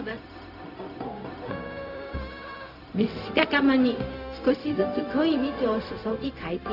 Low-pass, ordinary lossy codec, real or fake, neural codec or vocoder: 5.4 kHz; none; fake; codec, 16 kHz, 0.4 kbps, LongCat-Audio-Codec